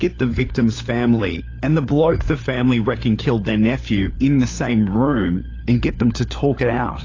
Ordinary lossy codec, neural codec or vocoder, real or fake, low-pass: AAC, 32 kbps; codec, 16 kHz, 4 kbps, FunCodec, trained on LibriTTS, 50 frames a second; fake; 7.2 kHz